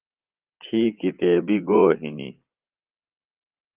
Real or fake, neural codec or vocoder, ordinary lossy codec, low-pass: fake; vocoder, 44.1 kHz, 80 mel bands, Vocos; Opus, 32 kbps; 3.6 kHz